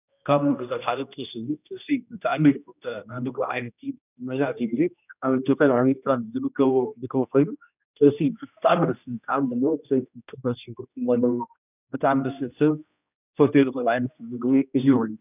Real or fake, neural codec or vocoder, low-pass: fake; codec, 16 kHz, 1 kbps, X-Codec, HuBERT features, trained on general audio; 3.6 kHz